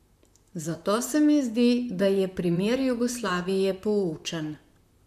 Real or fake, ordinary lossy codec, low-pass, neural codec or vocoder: fake; AAC, 96 kbps; 14.4 kHz; vocoder, 44.1 kHz, 128 mel bands, Pupu-Vocoder